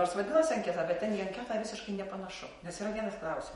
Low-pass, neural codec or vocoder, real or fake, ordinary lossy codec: 19.8 kHz; vocoder, 48 kHz, 128 mel bands, Vocos; fake; MP3, 48 kbps